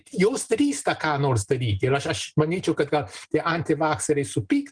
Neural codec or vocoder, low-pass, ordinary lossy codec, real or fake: none; 9.9 kHz; Opus, 24 kbps; real